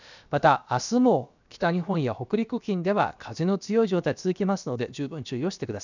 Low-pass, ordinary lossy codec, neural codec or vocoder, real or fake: 7.2 kHz; none; codec, 16 kHz, about 1 kbps, DyCAST, with the encoder's durations; fake